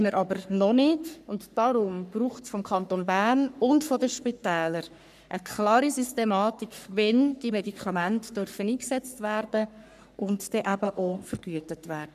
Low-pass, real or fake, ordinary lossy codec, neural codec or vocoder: 14.4 kHz; fake; none; codec, 44.1 kHz, 3.4 kbps, Pupu-Codec